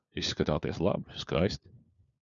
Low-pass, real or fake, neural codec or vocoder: 7.2 kHz; fake; codec, 16 kHz, 4 kbps, FunCodec, trained on LibriTTS, 50 frames a second